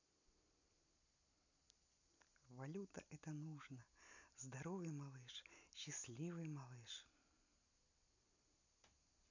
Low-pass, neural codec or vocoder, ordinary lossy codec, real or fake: 7.2 kHz; none; none; real